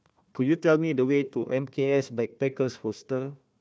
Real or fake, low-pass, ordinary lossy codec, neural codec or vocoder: fake; none; none; codec, 16 kHz, 1 kbps, FunCodec, trained on Chinese and English, 50 frames a second